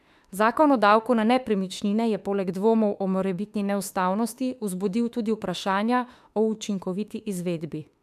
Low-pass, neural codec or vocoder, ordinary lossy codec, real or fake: 14.4 kHz; autoencoder, 48 kHz, 32 numbers a frame, DAC-VAE, trained on Japanese speech; AAC, 96 kbps; fake